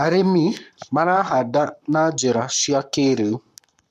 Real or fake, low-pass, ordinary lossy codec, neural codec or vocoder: fake; 14.4 kHz; none; codec, 44.1 kHz, 7.8 kbps, Pupu-Codec